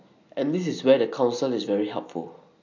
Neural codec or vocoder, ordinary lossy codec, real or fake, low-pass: none; none; real; 7.2 kHz